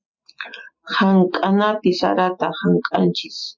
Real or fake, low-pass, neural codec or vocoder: real; 7.2 kHz; none